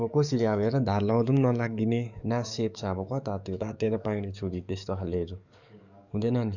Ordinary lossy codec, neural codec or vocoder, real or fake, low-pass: none; codec, 44.1 kHz, 7.8 kbps, DAC; fake; 7.2 kHz